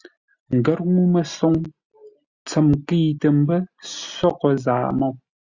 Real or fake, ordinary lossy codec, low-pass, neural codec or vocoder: real; Opus, 64 kbps; 7.2 kHz; none